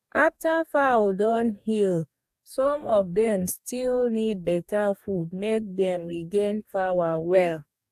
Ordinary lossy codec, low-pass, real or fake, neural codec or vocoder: AAC, 96 kbps; 14.4 kHz; fake; codec, 44.1 kHz, 2.6 kbps, DAC